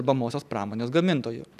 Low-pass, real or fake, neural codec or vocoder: 14.4 kHz; real; none